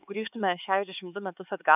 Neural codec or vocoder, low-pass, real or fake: codec, 16 kHz, 4 kbps, X-Codec, HuBERT features, trained on LibriSpeech; 3.6 kHz; fake